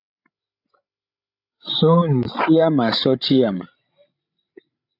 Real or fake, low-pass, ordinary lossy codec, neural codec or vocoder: fake; 5.4 kHz; AAC, 48 kbps; codec, 16 kHz, 16 kbps, FreqCodec, larger model